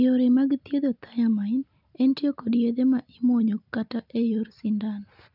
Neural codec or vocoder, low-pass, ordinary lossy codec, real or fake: none; 5.4 kHz; none; real